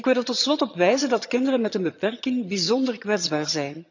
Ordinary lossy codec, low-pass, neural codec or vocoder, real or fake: none; 7.2 kHz; vocoder, 22.05 kHz, 80 mel bands, HiFi-GAN; fake